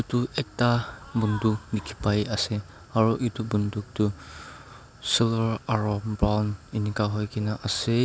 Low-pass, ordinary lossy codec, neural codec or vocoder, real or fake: none; none; none; real